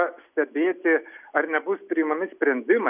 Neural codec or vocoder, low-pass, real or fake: none; 3.6 kHz; real